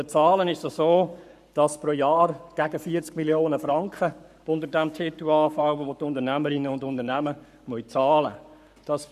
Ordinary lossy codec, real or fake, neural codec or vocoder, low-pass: none; fake; codec, 44.1 kHz, 7.8 kbps, Pupu-Codec; 14.4 kHz